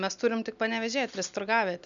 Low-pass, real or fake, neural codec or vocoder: 7.2 kHz; real; none